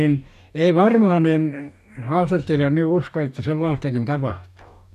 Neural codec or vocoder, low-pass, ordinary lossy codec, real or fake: codec, 44.1 kHz, 2.6 kbps, DAC; 14.4 kHz; none; fake